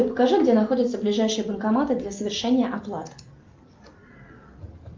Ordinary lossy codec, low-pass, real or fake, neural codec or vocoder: Opus, 24 kbps; 7.2 kHz; real; none